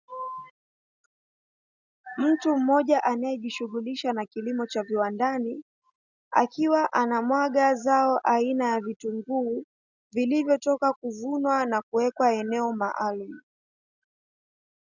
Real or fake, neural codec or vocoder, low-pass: real; none; 7.2 kHz